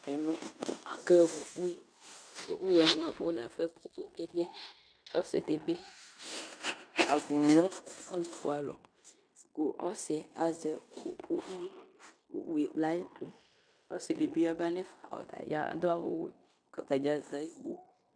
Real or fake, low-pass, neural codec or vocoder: fake; 9.9 kHz; codec, 16 kHz in and 24 kHz out, 0.9 kbps, LongCat-Audio-Codec, fine tuned four codebook decoder